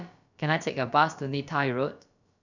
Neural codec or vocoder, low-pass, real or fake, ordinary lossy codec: codec, 16 kHz, about 1 kbps, DyCAST, with the encoder's durations; 7.2 kHz; fake; none